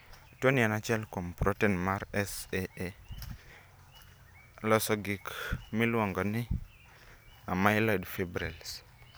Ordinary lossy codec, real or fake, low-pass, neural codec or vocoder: none; real; none; none